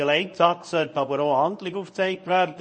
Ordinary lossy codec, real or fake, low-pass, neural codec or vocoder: MP3, 32 kbps; fake; 9.9 kHz; codec, 24 kHz, 0.9 kbps, WavTokenizer, medium speech release version 1